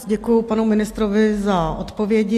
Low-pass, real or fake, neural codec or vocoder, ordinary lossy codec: 14.4 kHz; real; none; AAC, 64 kbps